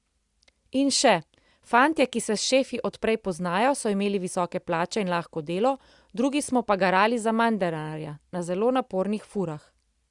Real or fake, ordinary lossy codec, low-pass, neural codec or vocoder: real; Opus, 64 kbps; 10.8 kHz; none